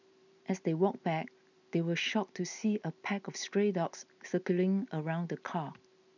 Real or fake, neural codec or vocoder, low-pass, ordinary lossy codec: real; none; 7.2 kHz; none